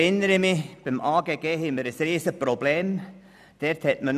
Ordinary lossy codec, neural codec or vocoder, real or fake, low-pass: none; none; real; 14.4 kHz